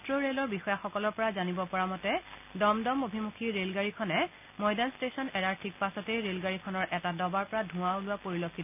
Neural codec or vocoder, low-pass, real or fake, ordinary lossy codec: none; 3.6 kHz; real; none